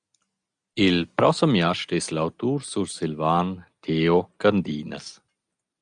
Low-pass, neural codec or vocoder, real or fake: 9.9 kHz; none; real